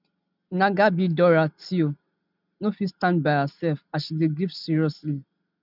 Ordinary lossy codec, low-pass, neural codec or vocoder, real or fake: none; 5.4 kHz; none; real